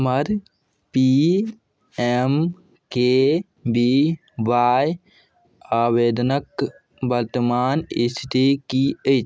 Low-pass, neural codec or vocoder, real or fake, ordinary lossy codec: none; none; real; none